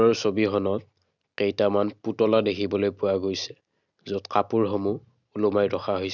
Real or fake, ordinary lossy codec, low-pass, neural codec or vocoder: real; none; 7.2 kHz; none